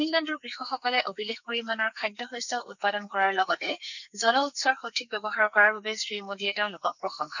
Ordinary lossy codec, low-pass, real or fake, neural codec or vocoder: none; 7.2 kHz; fake; codec, 44.1 kHz, 2.6 kbps, SNAC